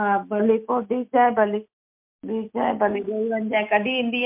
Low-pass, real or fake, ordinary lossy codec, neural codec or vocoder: 3.6 kHz; real; MP3, 32 kbps; none